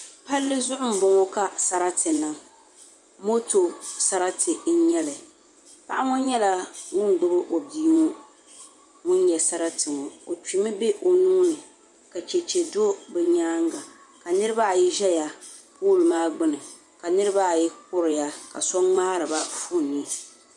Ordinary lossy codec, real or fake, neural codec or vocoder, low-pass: MP3, 96 kbps; fake; vocoder, 24 kHz, 100 mel bands, Vocos; 10.8 kHz